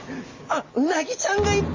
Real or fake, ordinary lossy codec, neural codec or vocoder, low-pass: real; MP3, 32 kbps; none; 7.2 kHz